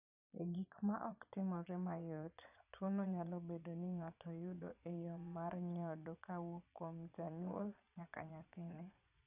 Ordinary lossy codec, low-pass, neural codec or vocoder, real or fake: none; 3.6 kHz; vocoder, 22.05 kHz, 80 mel bands, Vocos; fake